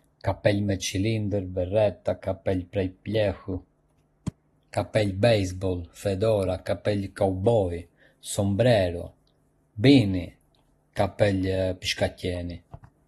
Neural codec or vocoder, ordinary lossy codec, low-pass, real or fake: none; AAC, 32 kbps; 19.8 kHz; real